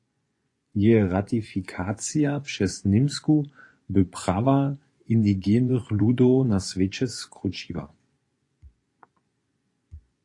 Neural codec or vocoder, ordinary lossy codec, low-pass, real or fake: vocoder, 24 kHz, 100 mel bands, Vocos; AAC, 48 kbps; 10.8 kHz; fake